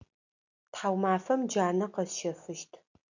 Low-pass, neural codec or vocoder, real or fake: 7.2 kHz; none; real